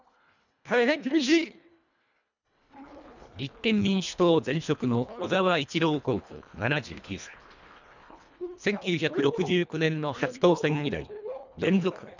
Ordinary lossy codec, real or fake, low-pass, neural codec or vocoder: none; fake; 7.2 kHz; codec, 24 kHz, 1.5 kbps, HILCodec